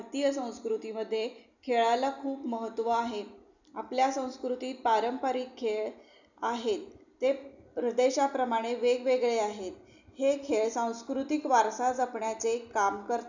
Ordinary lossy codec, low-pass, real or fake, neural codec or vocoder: none; 7.2 kHz; real; none